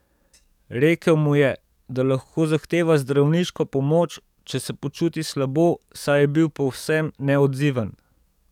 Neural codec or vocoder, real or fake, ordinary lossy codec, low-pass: codec, 44.1 kHz, 7.8 kbps, DAC; fake; none; 19.8 kHz